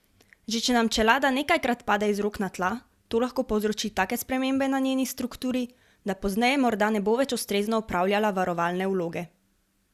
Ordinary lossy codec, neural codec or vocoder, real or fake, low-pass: Opus, 64 kbps; none; real; 14.4 kHz